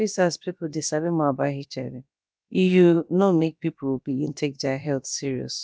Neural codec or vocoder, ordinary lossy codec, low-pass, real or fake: codec, 16 kHz, about 1 kbps, DyCAST, with the encoder's durations; none; none; fake